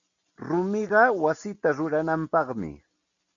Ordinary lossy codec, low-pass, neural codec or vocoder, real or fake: MP3, 64 kbps; 7.2 kHz; none; real